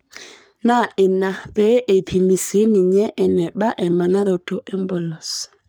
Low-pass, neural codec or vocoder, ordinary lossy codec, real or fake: none; codec, 44.1 kHz, 3.4 kbps, Pupu-Codec; none; fake